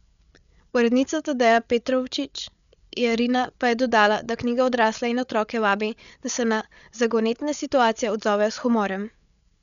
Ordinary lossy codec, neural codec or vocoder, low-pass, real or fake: none; codec, 16 kHz, 8 kbps, FreqCodec, larger model; 7.2 kHz; fake